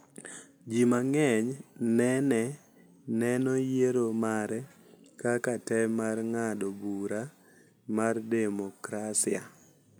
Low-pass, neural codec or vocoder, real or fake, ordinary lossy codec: none; none; real; none